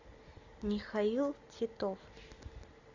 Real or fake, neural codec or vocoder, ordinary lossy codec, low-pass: real; none; Opus, 64 kbps; 7.2 kHz